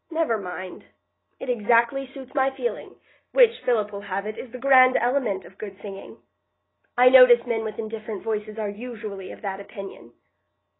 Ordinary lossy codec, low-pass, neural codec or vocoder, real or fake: AAC, 16 kbps; 7.2 kHz; none; real